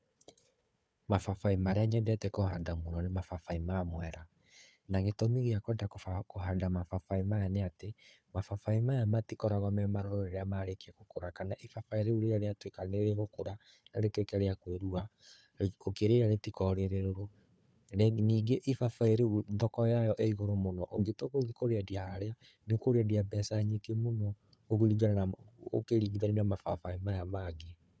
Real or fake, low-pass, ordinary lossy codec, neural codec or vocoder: fake; none; none; codec, 16 kHz, 4 kbps, FunCodec, trained on Chinese and English, 50 frames a second